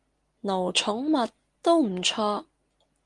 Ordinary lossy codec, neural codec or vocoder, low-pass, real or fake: Opus, 24 kbps; none; 10.8 kHz; real